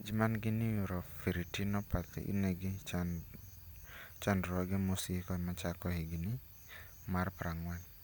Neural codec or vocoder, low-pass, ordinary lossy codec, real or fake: none; none; none; real